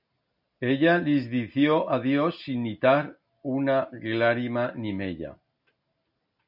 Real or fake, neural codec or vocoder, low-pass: real; none; 5.4 kHz